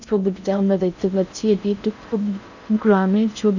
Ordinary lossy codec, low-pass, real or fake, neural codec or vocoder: none; 7.2 kHz; fake; codec, 16 kHz in and 24 kHz out, 0.6 kbps, FocalCodec, streaming, 4096 codes